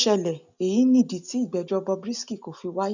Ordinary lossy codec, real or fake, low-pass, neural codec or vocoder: none; real; 7.2 kHz; none